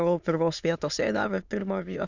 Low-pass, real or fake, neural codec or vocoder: 7.2 kHz; fake; autoencoder, 22.05 kHz, a latent of 192 numbers a frame, VITS, trained on many speakers